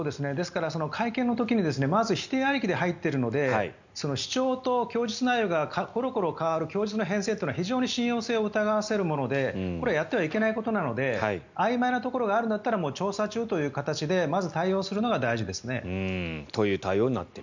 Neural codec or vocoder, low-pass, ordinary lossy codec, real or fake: none; 7.2 kHz; none; real